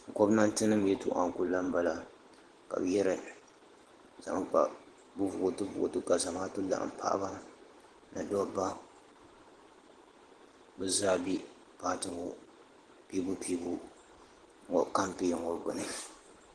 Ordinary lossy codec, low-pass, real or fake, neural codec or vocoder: Opus, 16 kbps; 10.8 kHz; fake; vocoder, 44.1 kHz, 128 mel bands every 512 samples, BigVGAN v2